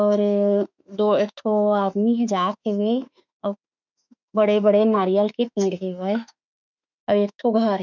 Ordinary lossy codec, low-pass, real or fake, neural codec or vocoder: none; 7.2 kHz; fake; autoencoder, 48 kHz, 32 numbers a frame, DAC-VAE, trained on Japanese speech